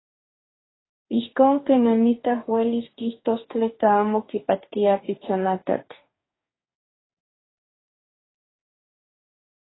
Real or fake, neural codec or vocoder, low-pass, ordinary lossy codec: fake; codec, 44.1 kHz, 2.6 kbps, DAC; 7.2 kHz; AAC, 16 kbps